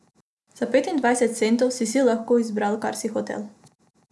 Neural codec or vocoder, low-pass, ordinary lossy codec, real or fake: none; none; none; real